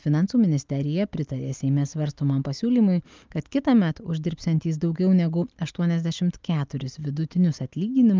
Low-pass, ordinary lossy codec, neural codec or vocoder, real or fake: 7.2 kHz; Opus, 24 kbps; none; real